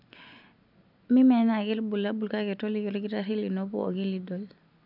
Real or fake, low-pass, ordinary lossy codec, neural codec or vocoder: real; 5.4 kHz; none; none